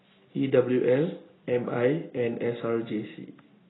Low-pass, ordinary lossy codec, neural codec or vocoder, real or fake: 7.2 kHz; AAC, 16 kbps; none; real